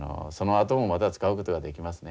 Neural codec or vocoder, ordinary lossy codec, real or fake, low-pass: none; none; real; none